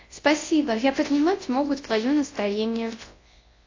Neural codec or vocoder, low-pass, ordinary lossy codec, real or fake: codec, 24 kHz, 0.9 kbps, WavTokenizer, large speech release; 7.2 kHz; AAC, 32 kbps; fake